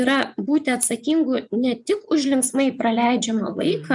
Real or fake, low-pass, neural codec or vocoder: fake; 10.8 kHz; vocoder, 24 kHz, 100 mel bands, Vocos